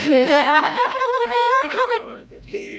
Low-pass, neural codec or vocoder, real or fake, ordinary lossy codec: none; codec, 16 kHz, 0.5 kbps, FreqCodec, larger model; fake; none